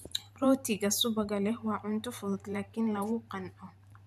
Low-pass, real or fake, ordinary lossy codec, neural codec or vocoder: 14.4 kHz; fake; none; vocoder, 44.1 kHz, 128 mel bands every 512 samples, BigVGAN v2